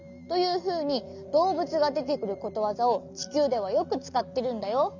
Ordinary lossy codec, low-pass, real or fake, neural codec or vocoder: none; 7.2 kHz; real; none